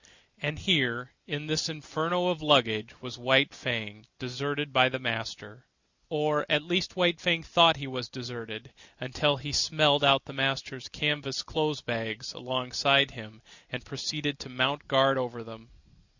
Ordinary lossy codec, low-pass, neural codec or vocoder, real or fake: Opus, 64 kbps; 7.2 kHz; none; real